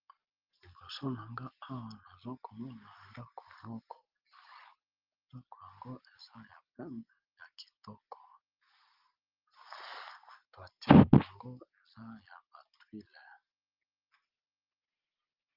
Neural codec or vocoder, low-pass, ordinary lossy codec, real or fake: none; 5.4 kHz; Opus, 32 kbps; real